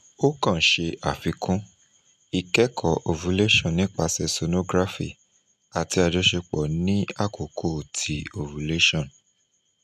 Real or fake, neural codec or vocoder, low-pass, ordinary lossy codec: real; none; 14.4 kHz; none